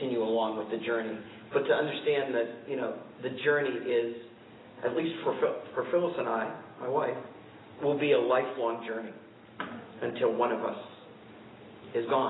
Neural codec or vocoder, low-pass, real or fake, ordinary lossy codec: none; 7.2 kHz; real; AAC, 16 kbps